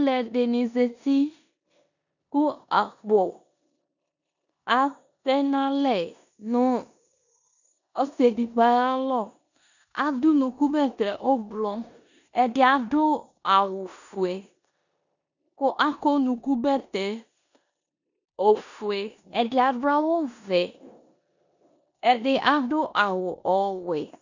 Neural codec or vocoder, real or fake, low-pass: codec, 16 kHz in and 24 kHz out, 0.9 kbps, LongCat-Audio-Codec, four codebook decoder; fake; 7.2 kHz